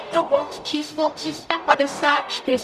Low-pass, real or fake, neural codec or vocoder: 14.4 kHz; fake; codec, 44.1 kHz, 0.9 kbps, DAC